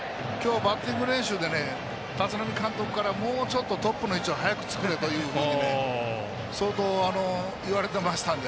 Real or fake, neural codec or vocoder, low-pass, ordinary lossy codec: real; none; none; none